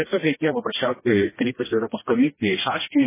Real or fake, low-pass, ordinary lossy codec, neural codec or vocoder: fake; 3.6 kHz; MP3, 16 kbps; codec, 16 kHz, 1 kbps, FreqCodec, smaller model